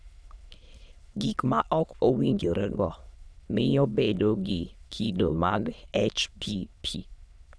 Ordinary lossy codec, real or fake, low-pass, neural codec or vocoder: none; fake; none; autoencoder, 22.05 kHz, a latent of 192 numbers a frame, VITS, trained on many speakers